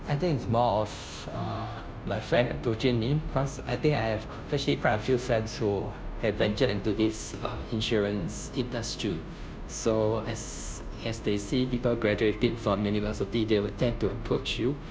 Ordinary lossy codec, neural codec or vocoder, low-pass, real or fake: none; codec, 16 kHz, 0.5 kbps, FunCodec, trained on Chinese and English, 25 frames a second; none; fake